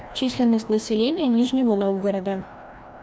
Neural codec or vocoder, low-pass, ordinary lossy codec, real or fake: codec, 16 kHz, 1 kbps, FreqCodec, larger model; none; none; fake